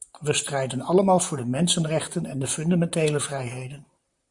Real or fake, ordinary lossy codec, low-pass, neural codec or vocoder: fake; Opus, 64 kbps; 10.8 kHz; vocoder, 44.1 kHz, 128 mel bands, Pupu-Vocoder